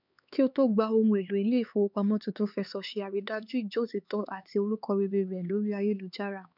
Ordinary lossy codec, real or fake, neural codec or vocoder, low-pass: none; fake; codec, 16 kHz, 4 kbps, X-Codec, HuBERT features, trained on LibriSpeech; 5.4 kHz